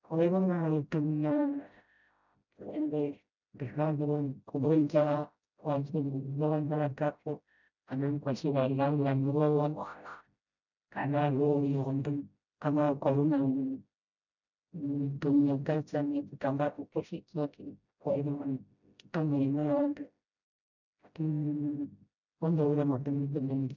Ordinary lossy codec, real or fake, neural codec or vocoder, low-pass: none; fake; codec, 16 kHz, 0.5 kbps, FreqCodec, smaller model; 7.2 kHz